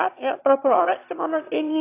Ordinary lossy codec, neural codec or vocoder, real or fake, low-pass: AAC, 32 kbps; autoencoder, 22.05 kHz, a latent of 192 numbers a frame, VITS, trained on one speaker; fake; 3.6 kHz